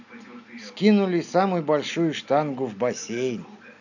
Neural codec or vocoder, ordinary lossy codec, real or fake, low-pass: none; none; real; 7.2 kHz